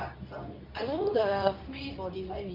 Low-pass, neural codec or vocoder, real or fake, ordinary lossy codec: 5.4 kHz; codec, 24 kHz, 0.9 kbps, WavTokenizer, medium speech release version 2; fake; none